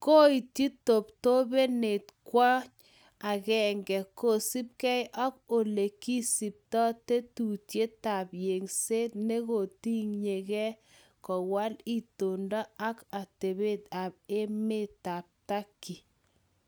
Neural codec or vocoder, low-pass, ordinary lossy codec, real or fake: none; none; none; real